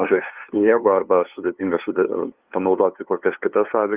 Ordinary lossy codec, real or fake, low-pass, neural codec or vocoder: Opus, 32 kbps; fake; 3.6 kHz; codec, 16 kHz, 2 kbps, FunCodec, trained on LibriTTS, 25 frames a second